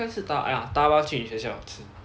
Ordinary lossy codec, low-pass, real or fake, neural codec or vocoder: none; none; real; none